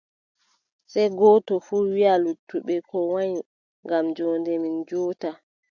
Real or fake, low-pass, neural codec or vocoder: real; 7.2 kHz; none